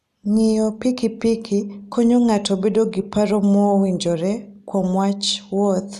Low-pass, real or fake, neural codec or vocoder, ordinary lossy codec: 14.4 kHz; real; none; none